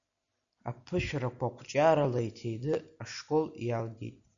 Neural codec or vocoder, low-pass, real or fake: none; 7.2 kHz; real